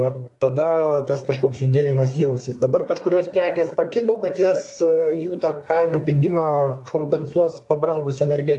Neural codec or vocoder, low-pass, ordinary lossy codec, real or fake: codec, 24 kHz, 1 kbps, SNAC; 10.8 kHz; AAC, 64 kbps; fake